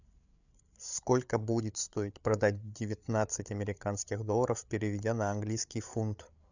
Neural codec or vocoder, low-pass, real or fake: codec, 16 kHz, 8 kbps, FreqCodec, larger model; 7.2 kHz; fake